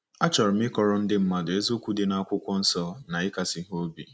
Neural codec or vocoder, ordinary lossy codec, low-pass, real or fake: none; none; none; real